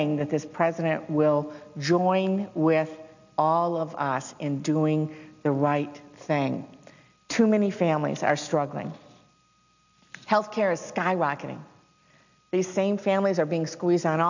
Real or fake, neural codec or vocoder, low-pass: real; none; 7.2 kHz